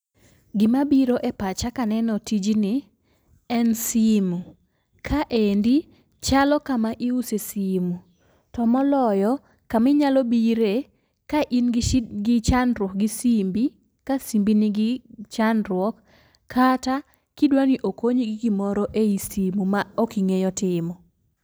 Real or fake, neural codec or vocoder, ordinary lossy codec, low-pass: real; none; none; none